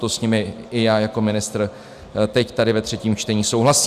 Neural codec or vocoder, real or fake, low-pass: none; real; 14.4 kHz